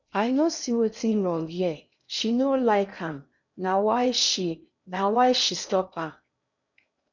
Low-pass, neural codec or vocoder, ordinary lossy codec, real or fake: 7.2 kHz; codec, 16 kHz in and 24 kHz out, 0.8 kbps, FocalCodec, streaming, 65536 codes; none; fake